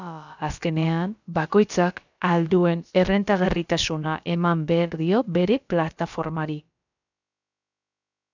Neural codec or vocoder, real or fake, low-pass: codec, 16 kHz, about 1 kbps, DyCAST, with the encoder's durations; fake; 7.2 kHz